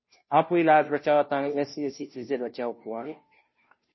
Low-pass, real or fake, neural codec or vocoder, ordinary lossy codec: 7.2 kHz; fake; codec, 16 kHz, 0.5 kbps, FunCodec, trained on Chinese and English, 25 frames a second; MP3, 24 kbps